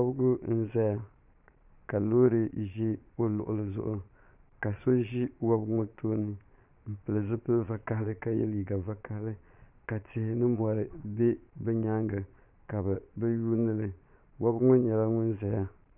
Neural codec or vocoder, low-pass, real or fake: vocoder, 22.05 kHz, 80 mel bands, Vocos; 3.6 kHz; fake